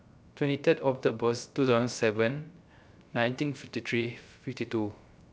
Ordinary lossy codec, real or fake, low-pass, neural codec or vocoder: none; fake; none; codec, 16 kHz, 0.3 kbps, FocalCodec